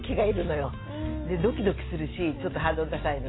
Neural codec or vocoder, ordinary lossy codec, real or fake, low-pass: none; AAC, 16 kbps; real; 7.2 kHz